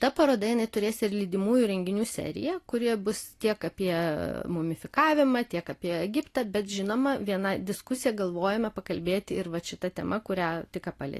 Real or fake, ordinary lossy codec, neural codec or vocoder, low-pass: real; AAC, 48 kbps; none; 14.4 kHz